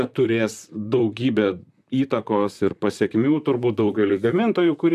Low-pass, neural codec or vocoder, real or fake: 14.4 kHz; vocoder, 44.1 kHz, 128 mel bands, Pupu-Vocoder; fake